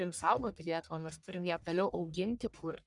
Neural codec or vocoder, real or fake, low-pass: codec, 44.1 kHz, 1.7 kbps, Pupu-Codec; fake; 10.8 kHz